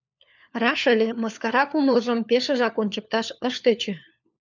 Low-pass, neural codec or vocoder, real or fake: 7.2 kHz; codec, 16 kHz, 4 kbps, FunCodec, trained on LibriTTS, 50 frames a second; fake